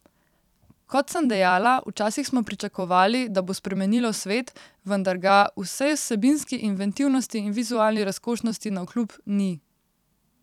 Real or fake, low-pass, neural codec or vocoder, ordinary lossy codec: fake; 19.8 kHz; vocoder, 44.1 kHz, 128 mel bands every 256 samples, BigVGAN v2; none